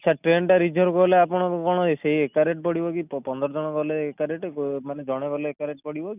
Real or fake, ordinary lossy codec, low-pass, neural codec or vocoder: real; none; 3.6 kHz; none